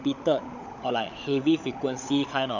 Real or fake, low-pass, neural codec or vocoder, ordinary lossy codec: fake; 7.2 kHz; codec, 16 kHz, 16 kbps, FunCodec, trained on LibriTTS, 50 frames a second; none